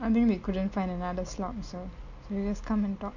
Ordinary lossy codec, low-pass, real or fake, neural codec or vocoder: MP3, 48 kbps; 7.2 kHz; real; none